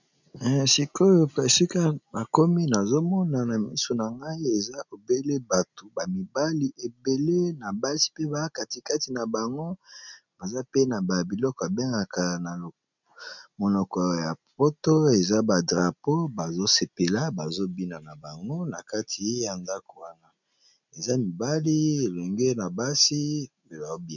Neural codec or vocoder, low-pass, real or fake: none; 7.2 kHz; real